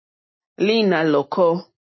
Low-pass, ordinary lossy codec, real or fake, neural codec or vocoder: 7.2 kHz; MP3, 24 kbps; real; none